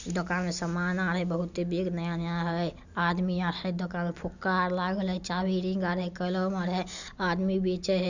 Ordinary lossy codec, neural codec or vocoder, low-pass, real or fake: none; none; 7.2 kHz; real